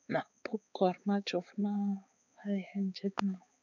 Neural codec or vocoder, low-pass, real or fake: codec, 16 kHz, 4 kbps, X-Codec, HuBERT features, trained on balanced general audio; 7.2 kHz; fake